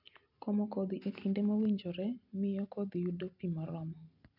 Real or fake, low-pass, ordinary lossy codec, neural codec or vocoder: real; 5.4 kHz; none; none